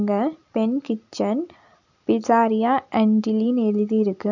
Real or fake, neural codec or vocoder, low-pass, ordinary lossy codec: real; none; 7.2 kHz; none